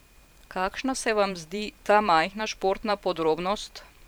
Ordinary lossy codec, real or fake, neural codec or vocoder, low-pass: none; fake; vocoder, 44.1 kHz, 128 mel bands every 512 samples, BigVGAN v2; none